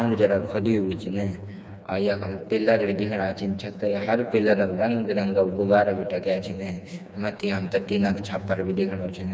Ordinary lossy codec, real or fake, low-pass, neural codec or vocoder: none; fake; none; codec, 16 kHz, 2 kbps, FreqCodec, smaller model